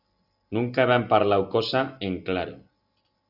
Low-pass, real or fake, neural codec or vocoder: 5.4 kHz; real; none